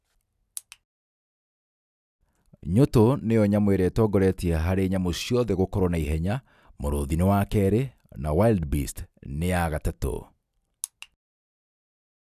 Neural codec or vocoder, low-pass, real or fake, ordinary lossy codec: none; 14.4 kHz; real; none